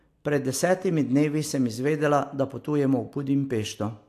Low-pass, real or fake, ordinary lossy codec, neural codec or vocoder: 14.4 kHz; real; AAC, 64 kbps; none